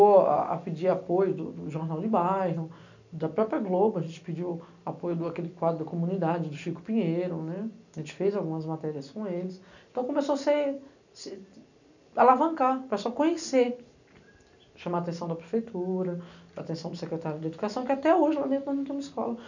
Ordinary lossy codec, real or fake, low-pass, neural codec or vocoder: none; real; 7.2 kHz; none